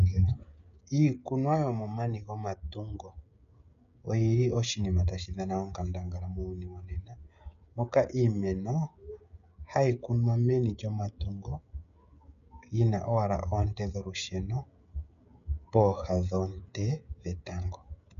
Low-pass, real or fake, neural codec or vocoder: 7.2 kHz; fake; codec, 16 kHz, 16 kbps, FreqCodec, smaller model